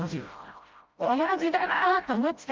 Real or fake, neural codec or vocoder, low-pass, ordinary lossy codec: fake; codec, 16 kHz, 0.5 kbps, FreqCodec, smaller model; 7.2 kHz; Opus, 32 kbps